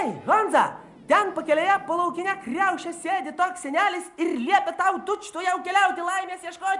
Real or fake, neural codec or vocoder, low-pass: real; none; 10.8 kHz